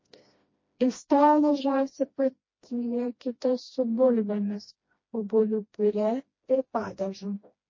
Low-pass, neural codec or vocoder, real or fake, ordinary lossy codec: 7.2 kHz; codec, 16 kHz, 1 kbps, FreqCodec, smaller model; fake; MP3, 32 kbps